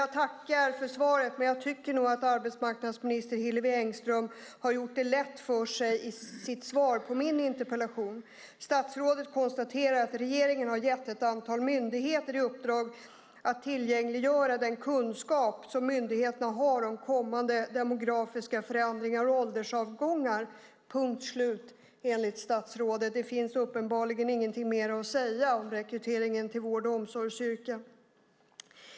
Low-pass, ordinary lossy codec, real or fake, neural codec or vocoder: none; none; real; none